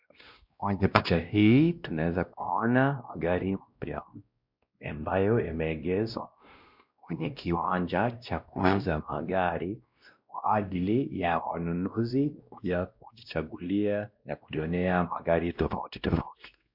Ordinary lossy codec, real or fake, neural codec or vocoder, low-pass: MP3, 48 kbps; fake; codec, 16 kHz, 1 kbps, X-Codec, WavLM features, trained on Multilingual LibriSpeech; 5.4 kHz